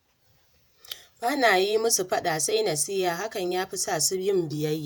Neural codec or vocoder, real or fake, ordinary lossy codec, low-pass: vocoder, 48 kHz, 128 mel bands, Vocos; fake; none; none